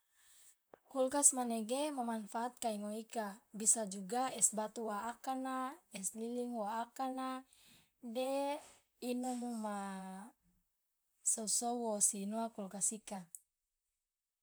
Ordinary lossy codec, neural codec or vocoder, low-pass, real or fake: none; vocoder, 44.1 kHz, 128 mel bands, Pupu-Vocoder; none; fake